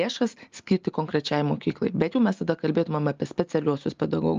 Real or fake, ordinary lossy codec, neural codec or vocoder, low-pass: real; Opus, 24 kbps; none; 7.2 kHz